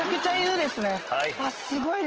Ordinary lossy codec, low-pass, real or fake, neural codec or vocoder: Opus, 16 kbps; 7.2 kHz; real; none